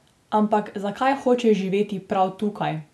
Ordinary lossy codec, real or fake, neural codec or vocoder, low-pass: none; real; none; none